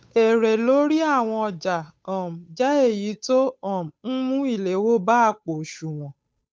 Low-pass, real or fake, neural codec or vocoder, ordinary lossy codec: none; fake; codec, 16 kHz, 8 kbps, FunCodec, trained on Chinese and English, 25 frames a second; none